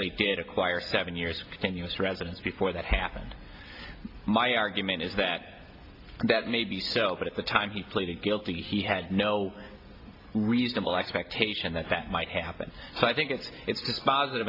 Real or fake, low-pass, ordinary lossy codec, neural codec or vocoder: real; 5.4 kHz; AAC, 32 kbps; none